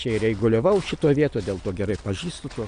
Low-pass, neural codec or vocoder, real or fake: 9.9 kHz; none; real